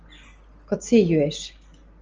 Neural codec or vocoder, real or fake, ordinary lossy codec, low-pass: none; real; Opus, 24 kbps; 7.2 kHz